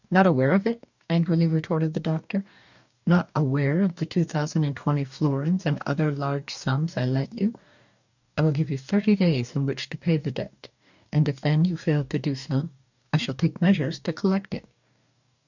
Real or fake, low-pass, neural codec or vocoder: fake; 7.2 kHz; codec, 44.1 kHz, 2.6 kbps, DAC